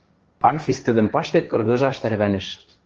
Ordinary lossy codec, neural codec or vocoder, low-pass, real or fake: Opus, 24 kbps; codec, 16 kHz, 1.1 kbps, Voila-Tokenizer; 7.2 kHz; fake